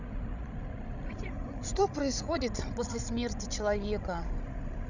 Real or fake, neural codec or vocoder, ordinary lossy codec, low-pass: fake; codec, 16 kHz, 16 kbps, FreqCodec, larger model; none; 7.2 kHz